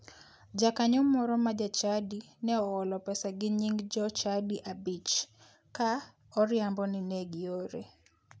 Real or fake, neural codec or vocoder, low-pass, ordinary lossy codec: real; none; none; none